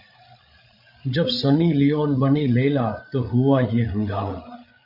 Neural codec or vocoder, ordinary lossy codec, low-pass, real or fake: codec, 16 kHz, 8 kbps, FreqCodec, larger model; MP3, 48 kbps; 5.4 kHz; fake